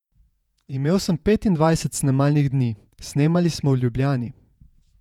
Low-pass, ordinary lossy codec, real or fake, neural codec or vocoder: 19.8 kHz; none; fake; vocoder, 48 kHz, 128 mel bands, Vocos